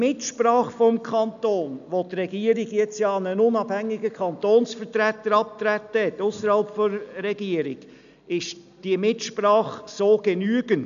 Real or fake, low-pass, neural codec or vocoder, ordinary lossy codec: real; 7.2 kHz; none; none